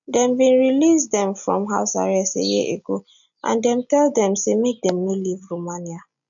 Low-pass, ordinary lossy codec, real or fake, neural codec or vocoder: 7.2 kHz; MP3, 96 kbps; real; none